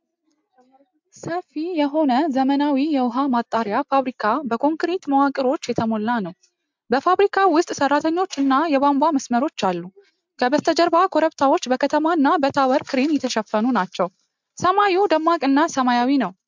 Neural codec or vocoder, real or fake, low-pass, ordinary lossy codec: none; real; 7.2 kHz; MP3, 64 kbps